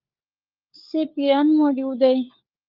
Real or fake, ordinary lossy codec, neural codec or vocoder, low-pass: fake; Opus, 24 kbps; codec, 16 kHz, 4 kbps, FunCodec, trained on LibriTTS, 50 frames a second; 5.4 kHz